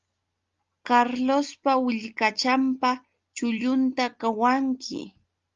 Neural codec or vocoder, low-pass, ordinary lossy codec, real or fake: none; 7.2 kHz; Opus, 32 kbps; real